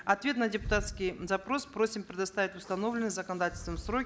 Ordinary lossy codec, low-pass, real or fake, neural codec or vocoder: none; none; real; none